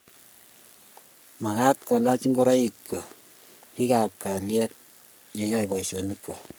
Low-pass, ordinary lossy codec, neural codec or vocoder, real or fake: none; none; codec, 44.1 kHz, 3.4 kbps, Pupu-Codec; fake